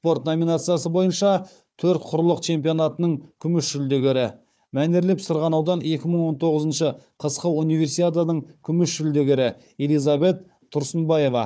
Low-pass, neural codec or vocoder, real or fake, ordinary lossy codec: none; codec, 16 kHz, 4 kbps, FunCodec, trained on Chinese and English, 50 frames a second; fake; none